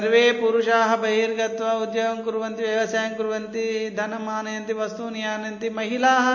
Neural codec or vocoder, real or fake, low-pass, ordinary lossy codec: none; real; 7.2 kHz; MP3, 32 kbps